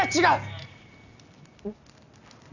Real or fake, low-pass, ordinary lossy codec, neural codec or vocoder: real; 7.2 kHz; none; none